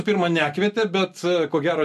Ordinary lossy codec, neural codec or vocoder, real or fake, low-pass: MP3, 64 kbps; none; real; 14.4 kHz